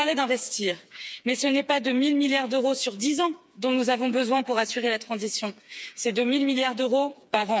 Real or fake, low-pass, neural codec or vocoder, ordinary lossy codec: fake; none; codec, 16 kHz, 4 kbps, FreqCodec, smaller model; none